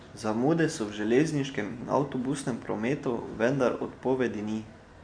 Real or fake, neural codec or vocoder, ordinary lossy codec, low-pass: real; none; AAC, 64 kbps; 9.9 kHz